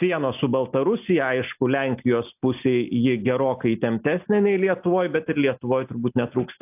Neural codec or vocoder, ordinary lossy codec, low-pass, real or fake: none; AAC, 32 kbps; 3.6 kHz; real